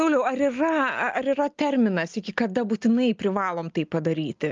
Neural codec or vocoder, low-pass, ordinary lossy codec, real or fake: none; 7.2 kHz; Opus, 24 kbps; real